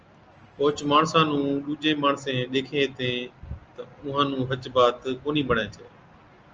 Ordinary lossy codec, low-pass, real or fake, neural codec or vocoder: Opus, 24 kbps; 7.2 kHz; real; none